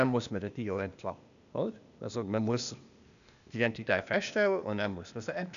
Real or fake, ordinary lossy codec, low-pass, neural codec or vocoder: fake; none; 7.2 kHz; codec, 16 kHz, 0.8 kbps, ZipCodec